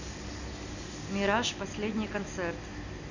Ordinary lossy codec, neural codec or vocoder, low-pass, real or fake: none; none; 7.2 kHz; real